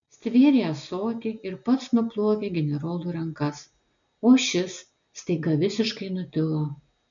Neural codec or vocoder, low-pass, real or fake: none; 7.2 kHz; real